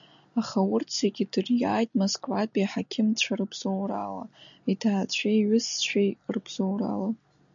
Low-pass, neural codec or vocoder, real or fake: 7.2 kHz; none; real